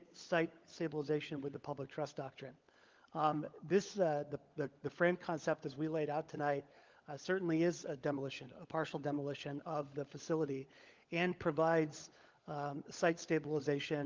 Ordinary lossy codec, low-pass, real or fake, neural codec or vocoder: Opus, 32 kbps; 7.2 kHz; fake; codec, 16 kHz, 16 kbps, FunCodec, trained on LibriTTS, 50 frames a second